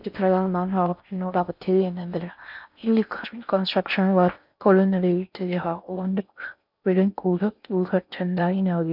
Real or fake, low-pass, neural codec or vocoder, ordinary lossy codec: fake; 5.4 kHz; codec, 16 kHz in and 24 kHz out, 0.6 kbps, FocalCodec, streaming, 4096 codes; none